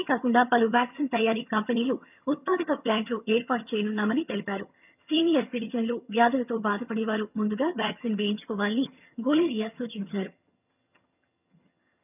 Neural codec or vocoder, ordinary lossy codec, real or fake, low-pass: vocoder, 22.05 kHz, 80 mel bands, HiFi-GAN; none; fake; 3.6 kHz